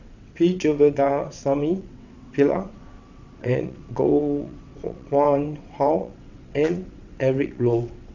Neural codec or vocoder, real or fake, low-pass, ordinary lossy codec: vocoder, 22.05 kHz, 80 mel bands, WaveNeXt; fake; 7.2 kHz; none